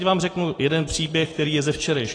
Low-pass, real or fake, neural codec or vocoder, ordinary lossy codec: 9.9 kHz; real; none; AAC, 32 kbps